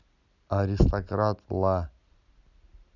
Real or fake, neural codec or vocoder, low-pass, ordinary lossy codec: real; none; 7.2 kHz; none